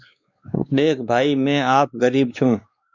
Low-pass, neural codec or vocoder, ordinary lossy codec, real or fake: 7.2 kHz; codec, 16 kHz, 2 kbps, X-Codec, WavLM features, trained on Multilingual LibriSpeech; Opus, 64 kbps; fake